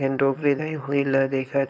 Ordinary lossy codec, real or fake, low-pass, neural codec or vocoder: none; fake; none; codec, 16 kHz, 4.8 kbps, FACodec